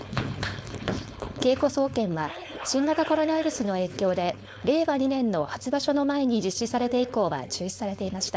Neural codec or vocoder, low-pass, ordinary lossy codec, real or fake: codec, 16 kHz, 4.8 kbps, FACodec; none; none; fake